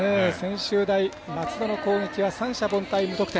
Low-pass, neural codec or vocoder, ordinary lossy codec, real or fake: none; none; none; real